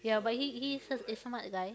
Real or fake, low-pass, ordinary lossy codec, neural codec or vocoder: real; none; none; none